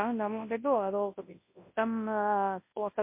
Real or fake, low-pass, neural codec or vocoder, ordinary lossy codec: fake; 3.6 kHz; codec, 24 kHz, 0.9 kbps, WavTokenizer, large speech release; MP3, 24 kbps